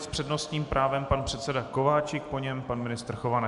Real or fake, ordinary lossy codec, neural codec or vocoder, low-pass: real; MP3, 96 kbps; none; 10.8 kHz